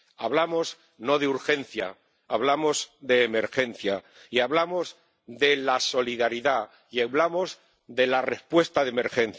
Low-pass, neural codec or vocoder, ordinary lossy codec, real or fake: none; none; none; real